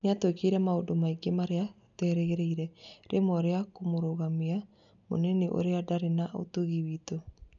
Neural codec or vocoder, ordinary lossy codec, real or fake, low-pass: none; none; real; 7.2 kHz